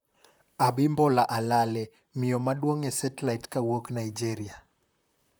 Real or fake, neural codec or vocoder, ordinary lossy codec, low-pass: fake; vocoder, 44.1 kHz, 128 mel bands, Pupu-Vocoder; none; none